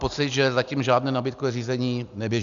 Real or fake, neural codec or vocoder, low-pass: real; none; 7.2 kHz